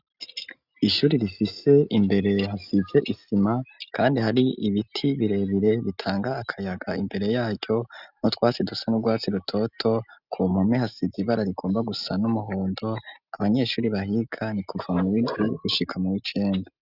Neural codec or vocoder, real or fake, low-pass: none; real; 5.4 kHz